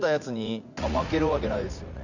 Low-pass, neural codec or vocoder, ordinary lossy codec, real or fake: 7.2 kHz; vocoder, 44.1 kHz, 80 mel bands, Vocos; none; fake